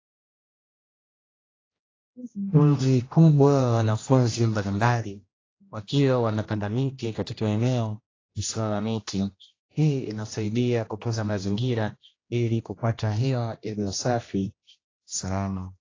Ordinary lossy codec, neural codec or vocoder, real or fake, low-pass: AAC, 32 kbps; codec, 16 kHz, 1 kbps, X-Codec, HuBERT features, trained on general audio; fake; 7.2 kHz